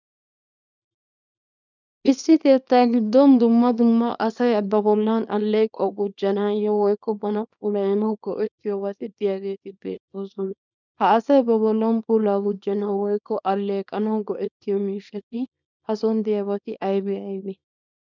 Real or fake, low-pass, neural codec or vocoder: fake; 7.2 kHz; codec, 24 kHz, 0.9 kbps, WavTokenizer, small release